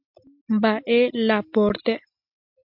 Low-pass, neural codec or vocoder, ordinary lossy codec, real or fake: 5.4 kHz; none; MP3, 48 kbps; real